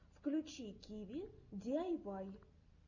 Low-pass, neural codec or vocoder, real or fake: 7.2 kHz; none; real